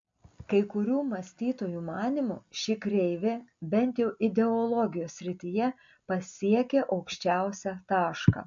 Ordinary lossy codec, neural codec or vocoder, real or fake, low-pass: MP3, 48 kbps; none; real; 7.2 kHz